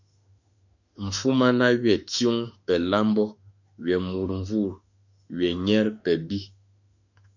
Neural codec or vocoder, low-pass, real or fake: autoencoder, 48 kHz, 32 numbers a frame, DAC-VAE, trained on Japanese speech; 7.2 kHz; fake